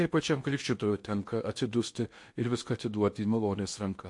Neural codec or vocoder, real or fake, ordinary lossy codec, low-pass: codec, 16 kHz in and 24 kHz out, 0.8 kbps, FocalCodec, streaming, 65536 codes; fake; MP3, 48 kbps; 10.8 kHz